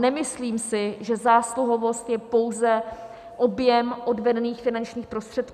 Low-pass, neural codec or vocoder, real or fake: 14.4 kHz; none; real